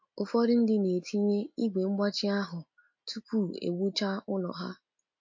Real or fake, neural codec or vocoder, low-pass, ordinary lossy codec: real; none; 7.2 kHz; MP3, 48 kbps